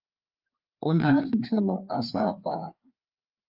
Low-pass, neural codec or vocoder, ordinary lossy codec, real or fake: 5.4 kHz; codec, 16 kHz, 2 kbps, FreqCodec, larger model; Opus, 24 kbps; fake